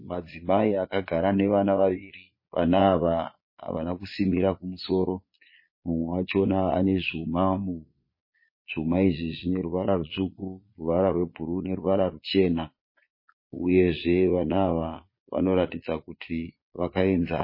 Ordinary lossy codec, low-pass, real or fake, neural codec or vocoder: MP3, 24 kbps; 5.4 kHz; fake; vocoder, 22.05 kHz, 80 mel bands, Vocos